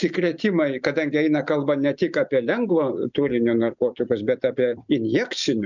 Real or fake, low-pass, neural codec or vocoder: real; 7.2 kHz; none